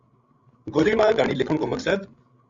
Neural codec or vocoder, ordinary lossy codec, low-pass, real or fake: codec, 16 kHz, 16 kbps, FreqCodec, larger model; Opus, 64 kbps; 7.2 kHz; fake